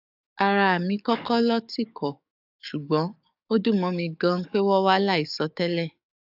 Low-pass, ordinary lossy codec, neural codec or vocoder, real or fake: 5.4 kHz; AAC, 48 kbps; codec, 44.1 kHz, 7.8 kbps, DAC; fake